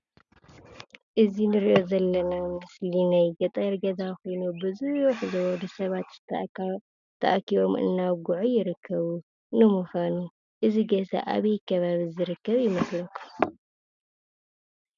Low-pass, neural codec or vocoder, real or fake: 7.2 kHz; none; real